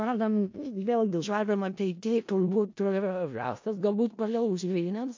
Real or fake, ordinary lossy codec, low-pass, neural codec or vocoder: fake; MP3, 48 kbps; 7.2 kHz; codec, 16 kHz in and 24 kHz out, 0.4 kbps, LongCat-Audio-Codec, four codebook decoder